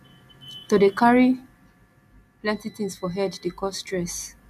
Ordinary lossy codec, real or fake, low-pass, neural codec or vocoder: none; real; 14.4 kHz; none